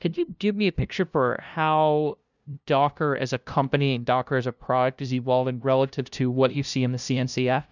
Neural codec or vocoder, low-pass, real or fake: codec, 16 kHz, 0.5 kbps, FunCodec, trained on LibriTTS, 25 frames a second; 7.2 kHz; fake